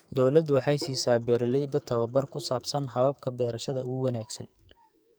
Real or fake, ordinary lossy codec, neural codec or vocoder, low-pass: fake; none; codec, 44.1 kHz, 2.6 kbps, SNAC; none